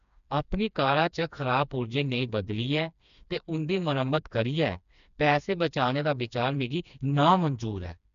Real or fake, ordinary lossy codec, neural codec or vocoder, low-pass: fake; none; codec, 16 kHz, 2 kbps, FreqCodec, smaller model; 7.2 kHz